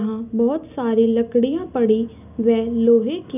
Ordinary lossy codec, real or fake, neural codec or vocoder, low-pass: none; real; none; 3.6 kHz